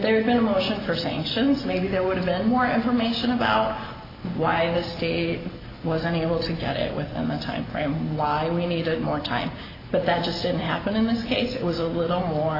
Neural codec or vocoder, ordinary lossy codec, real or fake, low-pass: none; AAC, 24 kbps; real; 5.4 kHz